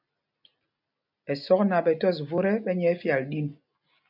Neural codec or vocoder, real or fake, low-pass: none; real; 5.4 kHz